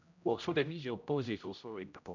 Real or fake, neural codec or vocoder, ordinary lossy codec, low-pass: fake; codec, 16 kHz, 0.5 kbps, X-Codec, HuBERT features, trained on general audio; none; 7.2 kHz